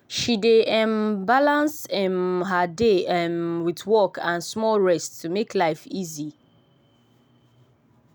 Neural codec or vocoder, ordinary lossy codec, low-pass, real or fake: none; none; none; real